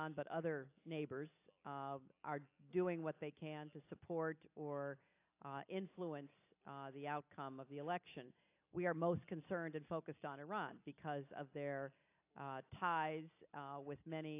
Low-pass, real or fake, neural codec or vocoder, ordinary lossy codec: 3.6 kHz; real; none; AAC, 32 kbps